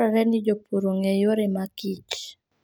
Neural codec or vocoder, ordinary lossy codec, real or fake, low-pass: none; none; real; none